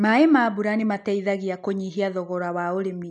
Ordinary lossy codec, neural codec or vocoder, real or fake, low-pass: none; none; real; 10.8 kHz